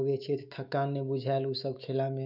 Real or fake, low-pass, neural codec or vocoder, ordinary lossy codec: fake; 5.4 kHz; autoencoder, 48 kHz, 128 numbers a frame, DAC-VAE, trained on Japanese speech; none